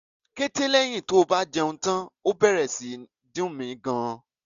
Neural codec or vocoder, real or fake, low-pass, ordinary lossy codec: none; real; 7.2 kHz; none